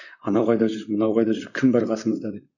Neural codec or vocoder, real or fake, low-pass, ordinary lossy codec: vocoder, 44.1 kHz, 128 mel bands, Pupu-Vocoder; fake; 7.2 kHz; none